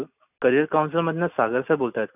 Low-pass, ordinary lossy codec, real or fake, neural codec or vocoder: 3.6 kHz; none; real; none